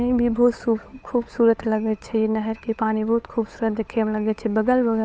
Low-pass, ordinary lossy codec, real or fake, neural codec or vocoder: none; none; fake; codec, 16 kHz, 8 kbps, FunCodec, trained on Chinese and English, 25 frames a second